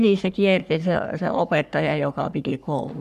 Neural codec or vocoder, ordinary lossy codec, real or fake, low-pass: codec, 44.1 kHz, 3.4 kbps, Pupu-Codec; MP3, 96 kbps; fake; 14.4 kHz